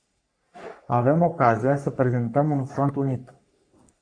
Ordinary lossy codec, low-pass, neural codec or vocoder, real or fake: MP3, 64 kbps; 9.9 kHz; codec, 44.1 kHz, 3.4 kbps, Pupu-Codec; fake